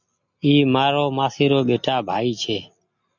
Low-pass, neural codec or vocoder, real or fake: 7.2 kHz; none; real